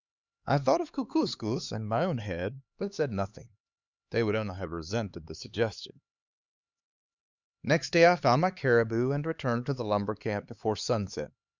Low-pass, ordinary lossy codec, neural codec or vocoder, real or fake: 7.2 kHz; Opus, 64 kbps; codec, 16 kHz, 4 kbps, X-Codec, HuBERT features, trained on LibriSpeech; fake